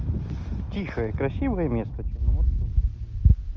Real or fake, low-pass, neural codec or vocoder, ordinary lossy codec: real; 7.2 kHz; none; Opus, 24 kbps